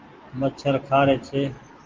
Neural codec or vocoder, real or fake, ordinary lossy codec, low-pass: none; real; Opus, 16 kbps; 7.2 kHz